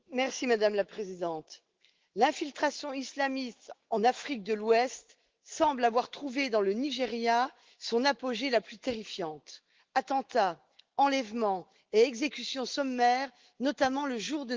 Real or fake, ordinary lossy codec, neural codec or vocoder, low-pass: fake; Opus, 16 kbps; autoencoder, 48 kHz, 128 numbers a frame, DAC-VAE, trained on Japanese speech; 7.2 kHz